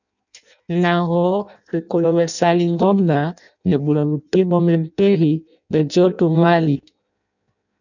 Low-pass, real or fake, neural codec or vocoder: 7.2 kHz; fake; codec, 16 kHz in and 24 kHz out, 0.6 kbps, FireRedTTS-2 codec